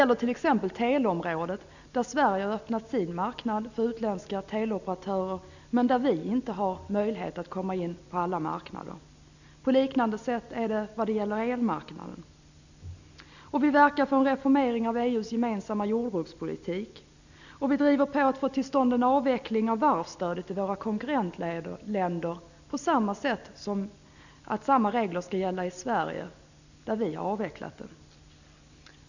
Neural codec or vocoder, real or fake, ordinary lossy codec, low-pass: none; real; none; 7.2 kHz